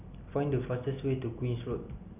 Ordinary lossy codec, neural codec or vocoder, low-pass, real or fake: none; none; 3.6 kHz; real